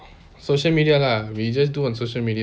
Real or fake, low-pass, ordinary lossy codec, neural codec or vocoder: real; none; none; none